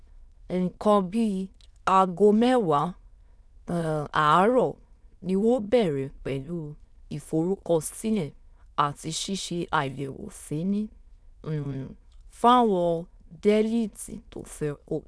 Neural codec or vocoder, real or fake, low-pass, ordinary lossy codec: autoencoder, 22.05 kHz, a latent of 192 numbers a frame, VITS, trained on many speakers; fake; none; none